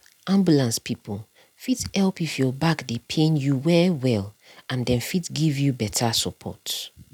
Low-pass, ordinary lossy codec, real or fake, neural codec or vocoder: 19.8 kHz; none; real; none